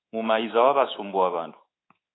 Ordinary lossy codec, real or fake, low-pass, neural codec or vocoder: AAC, 16 kbps; fake; 7.2 kHz; codec, 24 kHz, 3.1 kbps, DualCodec